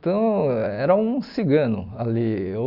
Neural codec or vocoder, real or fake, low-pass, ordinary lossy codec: none; real; 5.4 kHz; none